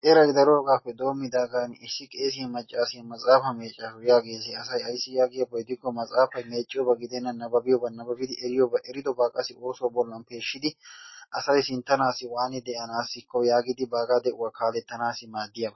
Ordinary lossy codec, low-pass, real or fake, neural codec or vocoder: MP3, 24 kbps; 7.2 kHz; real; none